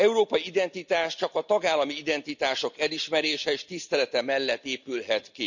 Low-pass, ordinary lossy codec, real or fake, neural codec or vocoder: 7.2 kHz; none; real; none